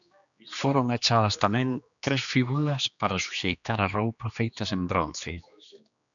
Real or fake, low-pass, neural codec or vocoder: fake; 7.2 kHz; codec, 16 kHz, 2 kbps, X-Codec, HuBERT features, trained on general audio